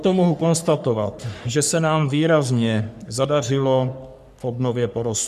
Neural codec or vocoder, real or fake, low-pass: codec, 44.1 kHz, 3.4 kbps, Pupu-Codec; fake; 14.4 kHz